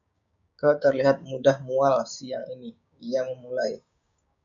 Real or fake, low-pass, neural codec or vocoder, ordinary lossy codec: fake; 7.2 kHz; codec, 16 kHz, 6 kbps, DAC; MP3, 64 kbps